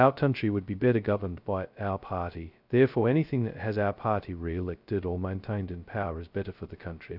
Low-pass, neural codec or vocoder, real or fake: 5.4 kHz; codec, 16 kHz, 0.2 kbps, FocalCodec; fake